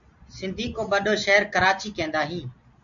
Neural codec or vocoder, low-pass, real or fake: none; 7.2 kHz; real